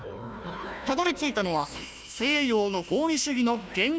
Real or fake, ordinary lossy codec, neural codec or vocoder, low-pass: fake; none; codec, 16 kHz, 1 kbps, FunCodec, trained on Chinese and English, 50 frames a second; none